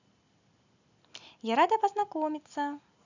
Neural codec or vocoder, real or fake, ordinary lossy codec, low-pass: none; real; none; 7.2 kHz